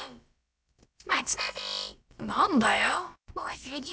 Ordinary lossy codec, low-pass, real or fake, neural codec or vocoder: none; none; fake; codec, 16 kHz, about 1 kbps, DyCAST, with the encoder's durations